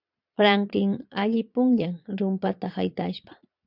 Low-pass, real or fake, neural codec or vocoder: 5.4 kHz; fake; vocoder, 22.05 kHz, 80 mel bands, Vocos